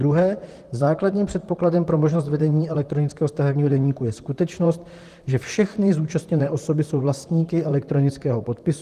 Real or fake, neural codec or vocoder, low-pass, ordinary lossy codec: fake; vocoder, 44.1 kHz, 128 mel bands, Pupu-Vocoder; 14.4 kHz; Opus, 24 kbps